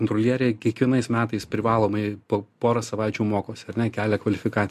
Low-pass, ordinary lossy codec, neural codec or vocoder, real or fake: 14.4 kHz; MP3, 64 kbps; none; real